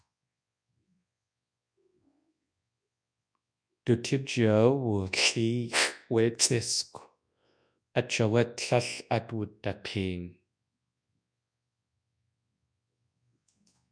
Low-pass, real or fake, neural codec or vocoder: 9.9 kHz; fake; codec, 24 kHz, 0.9 kbps, WavTokenizer, large speech release